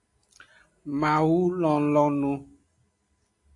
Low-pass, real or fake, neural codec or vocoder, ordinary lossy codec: 10.8 kHz; real; none; AAC, 48 kbps